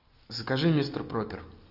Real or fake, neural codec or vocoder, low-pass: real; none; 5.4 kHz